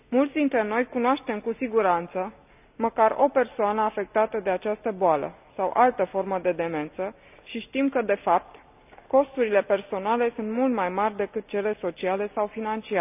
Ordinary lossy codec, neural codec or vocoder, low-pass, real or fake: none; none; 3.6 kHz; real